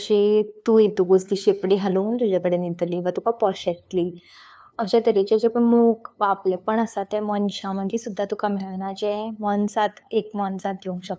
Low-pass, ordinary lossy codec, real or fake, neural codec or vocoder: none; none; fake; codec, 16 kHz, 2 kbps, FunCodec, trained on LibriTTS, 25 frames a second